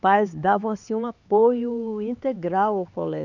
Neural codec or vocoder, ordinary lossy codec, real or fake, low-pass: codec, 16 kHz, 4 kbps, X-Codec, HuBERT features, trained on LibriSpeech; none; fake; 7.2 kHz